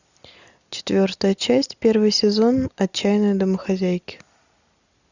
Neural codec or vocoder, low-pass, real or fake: none; 7.2 kHz; real